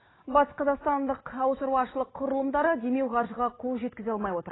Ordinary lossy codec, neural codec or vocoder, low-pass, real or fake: AAC, 16 kbps; none; 7.2 kHz; real